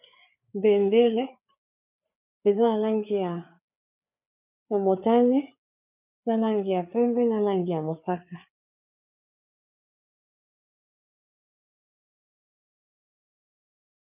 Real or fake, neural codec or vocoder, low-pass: fake; codec, 16 kHz, 4 kbps, FreqCodec, larger model; 3.6 kHz